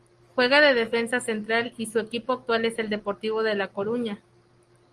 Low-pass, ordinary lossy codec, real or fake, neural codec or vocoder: 10.8 kHz; Opus, 24 kbps; real; none